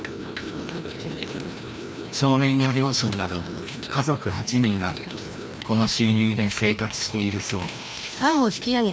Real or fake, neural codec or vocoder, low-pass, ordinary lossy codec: fake; codec, 16 kHz, 1 kbps, FreqCodec, larger model; none; none